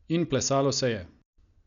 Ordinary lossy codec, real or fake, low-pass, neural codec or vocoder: none; real; 7.2 kHz; none